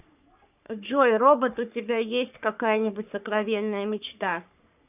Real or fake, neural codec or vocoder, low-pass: fake; codec, 44.1 kHz, 3.4 kbps, Pupu-Codec; 3.6 kHz